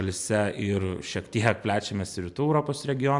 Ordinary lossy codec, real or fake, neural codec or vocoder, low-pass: MP3, 96 kbps; real; none; 10.8 kHz